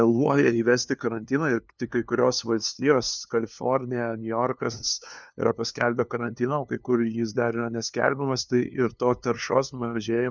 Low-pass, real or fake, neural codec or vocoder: 7.2 kHz; fake; codec, 16 kHz, 2 kbps, FunCodec, trained on LibriTTS, 25 frames a second